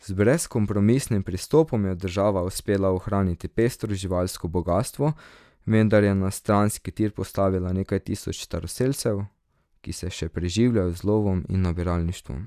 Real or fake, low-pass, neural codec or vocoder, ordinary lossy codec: real; 14.4 kHz; none; none